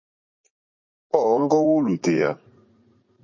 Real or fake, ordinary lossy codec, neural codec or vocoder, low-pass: fake; MP3, 32 kbps; vocoder, 44.1 kHz, 80 mel bands, Vocos; 7.2 kHz